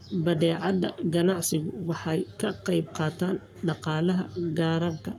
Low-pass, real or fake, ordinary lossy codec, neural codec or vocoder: 19.8 kHz; fake; none; codec, 44.1 kHz, 7.8 kbps, Pupu-Codec